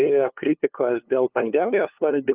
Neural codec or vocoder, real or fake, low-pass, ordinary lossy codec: codec, 16 kHz, 2 kbps, FunCodec, trained on LibriTTS, 25 frames a second; fake; 3.6 kHz; Opus, 32 kbps